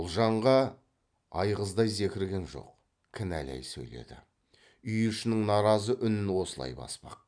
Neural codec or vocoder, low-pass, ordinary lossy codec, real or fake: none; 9.9 kHz; none; real